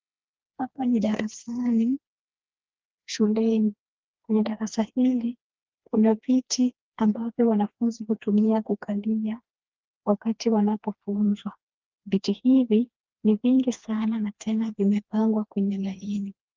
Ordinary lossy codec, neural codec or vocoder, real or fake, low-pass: Opus, 32 kbps; codec, 16 kHz, 2 kbps, FreqCodec, smaller model; fake; 7.2 kHz